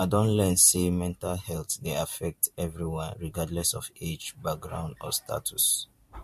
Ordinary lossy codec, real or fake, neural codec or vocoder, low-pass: MP3, 64 kbps; fake; vocoder, 44.1 kHz, 128 mel bands every 512 samples, BigVGAN v2; 14.4 kHz